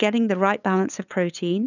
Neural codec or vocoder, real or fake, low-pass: codec, 16 kHz, 8 kbps, FunCodec, trained on LibriTTS, 25 frames a second; fake; 7.2 kHz